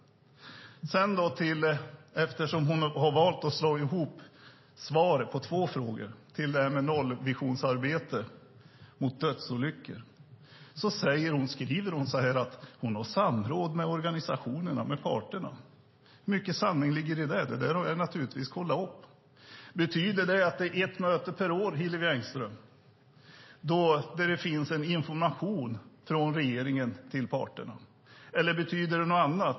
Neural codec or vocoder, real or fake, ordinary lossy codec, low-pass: none; real; MP3, 24 kbps; 7.2 kHz